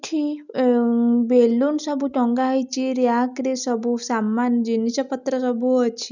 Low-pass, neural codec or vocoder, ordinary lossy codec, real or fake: 7.2 kHz; none; none; real